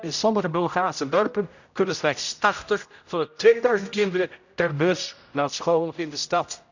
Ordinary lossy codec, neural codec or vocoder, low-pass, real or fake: none; codec, 16 kHz, 0.5 kbps, X-Codec, HuBERT features, trained on general audio; 7.2 kHz; fake